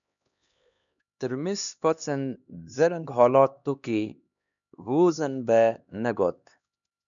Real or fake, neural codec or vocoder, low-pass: fake; codec, 16 kHz, 2 kbps, X-Codec, HuBERT features, trained on LibriSpeech; 7.2 kHz